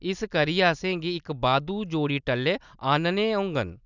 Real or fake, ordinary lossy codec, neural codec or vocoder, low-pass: real; none; none; 7.2 kHz